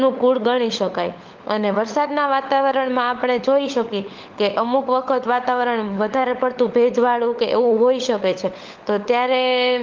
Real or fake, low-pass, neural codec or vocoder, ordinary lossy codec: fake; 7.2 kHz; codec, 16 kHz, 16 kbps, FunCodec, trained on LibriTTS, 50 frames a second; Opus, 32 kbps